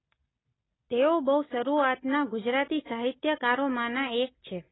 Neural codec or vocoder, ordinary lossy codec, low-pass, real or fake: none; AAC, 16 kbps; 7.2 kHz; real